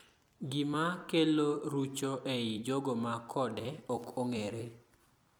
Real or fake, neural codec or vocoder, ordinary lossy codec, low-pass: fake; vocoder, 44.1 kHz, 128 mel bands every 256 samples, BigVGAN v2; none; none